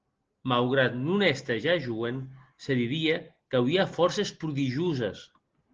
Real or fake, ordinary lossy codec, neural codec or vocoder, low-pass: real; Opus, 16 kbps; none; 7.2 kHz